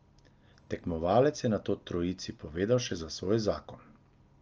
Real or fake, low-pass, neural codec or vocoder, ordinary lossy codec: real; 7.2 kHz; none; Opus, 24 kbps